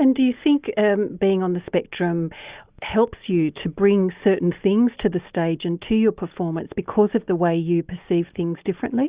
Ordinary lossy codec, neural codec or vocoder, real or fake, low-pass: Opus, 64 kbps; none; real; 3.6 kHz